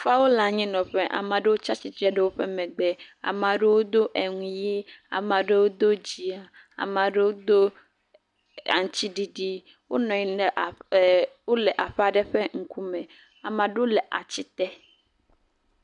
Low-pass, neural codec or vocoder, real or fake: 10.8 kHz; none; real